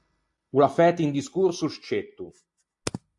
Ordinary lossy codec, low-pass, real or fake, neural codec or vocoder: AAC, 64 kbps; 10.8 kHz; real; none